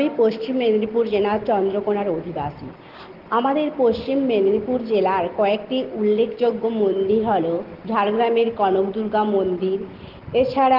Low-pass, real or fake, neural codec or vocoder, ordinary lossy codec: 5.4 kHz; real; none; Opus, 16 kbps